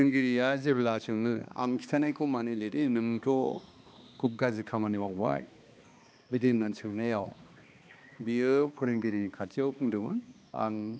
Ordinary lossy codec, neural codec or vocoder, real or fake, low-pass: none; codec, 16 kHz, 2 kbps, X-Codec, HuBERT features, trained on balanced general audio; fake; none